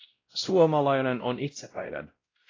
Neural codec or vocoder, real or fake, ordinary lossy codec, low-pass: codec, 16 kHz, 0.5 kbps, X-Codec, WavLM features, trained on Multilingual LibriSpeech; fake; AAC, 32 kbps; 7.2 kHz